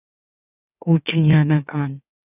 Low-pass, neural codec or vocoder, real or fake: 3.6 kHz; autoencoder, 44.1 kHz, a latent of 192 numbers a frame, MeloTTS; fake